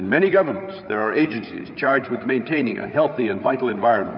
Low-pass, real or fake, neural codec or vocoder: 7.2 kHz; fake; codec, 16 kHz, 8 kbps, FreqCodec, larger model